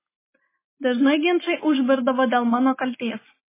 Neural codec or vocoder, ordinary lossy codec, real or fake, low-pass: none; MP3, 16 kbps; real; 3.6 kHz